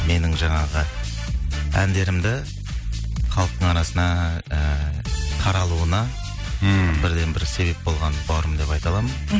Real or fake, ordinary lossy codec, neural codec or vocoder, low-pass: real; none; none; none